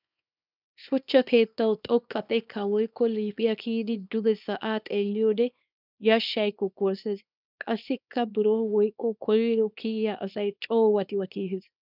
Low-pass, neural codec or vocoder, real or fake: 5.4 kHz; codec, 24 kHz, 0.9 kbps, WavTokenizer, small release; fake